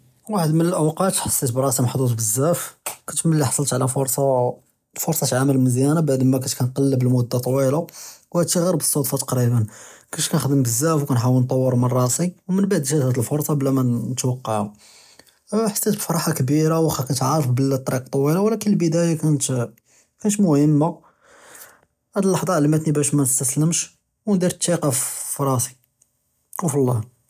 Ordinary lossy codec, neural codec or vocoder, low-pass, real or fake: none; none; 14.4 kHz; real